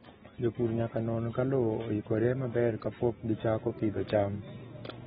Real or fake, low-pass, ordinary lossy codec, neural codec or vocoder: real; 14.4 kHz; AAC, 16 kbps; none